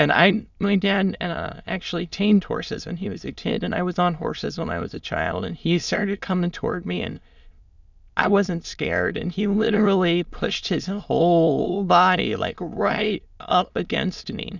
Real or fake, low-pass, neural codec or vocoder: fake; 7.2 kHz; autoencoder, 22.05 kHz, a latent of 192 numbers a frame, VITS, trained on many speakers